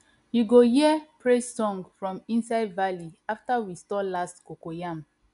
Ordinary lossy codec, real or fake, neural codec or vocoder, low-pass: none; real; none; 10.8 kHz